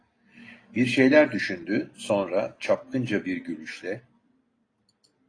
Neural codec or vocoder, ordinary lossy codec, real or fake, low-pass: none; AAC, 32 kbps; real; 9.9 kHz